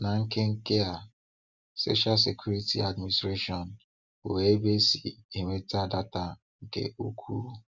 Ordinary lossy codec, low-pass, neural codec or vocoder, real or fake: Opus, 64 kbps; 7.2 kHz; none; real